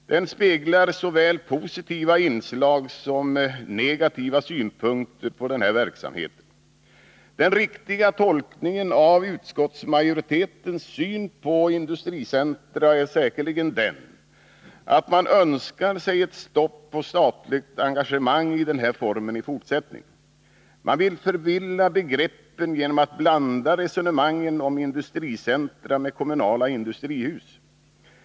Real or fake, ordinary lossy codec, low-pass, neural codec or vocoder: real; none; none; none